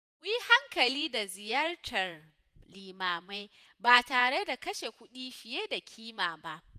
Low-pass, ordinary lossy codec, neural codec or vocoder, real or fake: 14.4 kHz; none; vocoder, 48 kHz, 128 mel bands, Vocos; fake